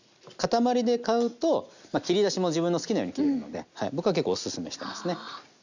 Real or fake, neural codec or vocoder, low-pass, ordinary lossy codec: real; none; 7.2 kHz; none